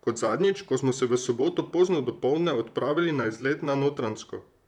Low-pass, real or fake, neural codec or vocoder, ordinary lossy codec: 19.8 kHz; fake; vocoder, 44.1 kHz, 128 mel bands, Pupu-Vocoder; none